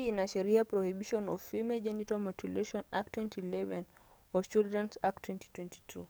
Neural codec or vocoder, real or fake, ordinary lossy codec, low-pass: codec, 44.1 kHz, 7.8 kbps, DAC; fake; none; none